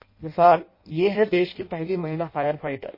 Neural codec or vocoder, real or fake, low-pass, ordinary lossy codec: codec, 16 kHz in and 24 kHz out, 0.6 kbps, FireRedTTS-2 codec; fake; 5.4 kHz; MP3, 24 kbps